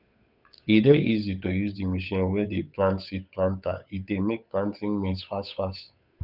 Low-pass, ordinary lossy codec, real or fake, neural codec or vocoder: 5.4 kHz; none; fake; codec, 16 kHz, 8 kbps, FunCodec, trained on Chinese and English, 25 frames a second